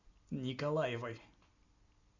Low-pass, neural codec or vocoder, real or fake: 7.2 kHz; none; real